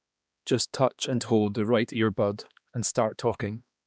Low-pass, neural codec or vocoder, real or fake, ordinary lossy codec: none; codec, 16 kHz, 2 kbps, X-Codec, HuBERT features, trained on balanced general audio; fake; none